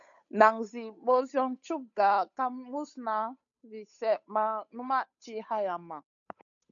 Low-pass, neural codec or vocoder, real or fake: 7.2 kHz; codec, 16 kHz, 8 kbps, FunCodec, trained on Chinese and English, 25 frames a second; fake